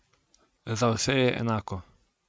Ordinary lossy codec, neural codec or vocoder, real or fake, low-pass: none; none; real; none